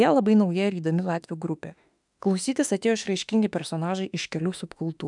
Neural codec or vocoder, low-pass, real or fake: autoencoder, 48 kHz, 32 numbers a frame, DAC-VAE, trained on Japanese speech; 10.8 kHz; fake